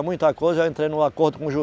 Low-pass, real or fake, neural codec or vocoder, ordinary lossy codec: none; real; none; none